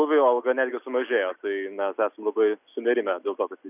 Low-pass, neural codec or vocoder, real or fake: 3.6 kHz; none; real